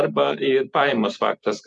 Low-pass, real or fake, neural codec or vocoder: 10.8 kHz; fake; vocoder, 44.1 kHz, 128 mel bands every 256 samples, BigVGAN v2